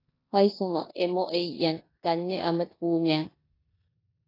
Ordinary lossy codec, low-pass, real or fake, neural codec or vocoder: AAC, 24 kbps; 5.4 kHz; fake; codec, 16 kHz in and 24 kHz out, 0.9 kbps, LongCat-Audio-Codec, four codebook decoder